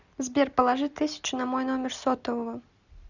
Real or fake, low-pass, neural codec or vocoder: real; 7.2 kHz; none